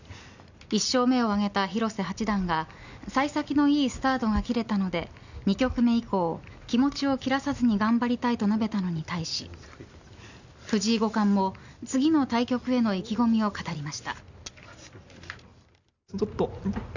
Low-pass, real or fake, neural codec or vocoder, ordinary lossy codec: 7.2 kHz; real; none; none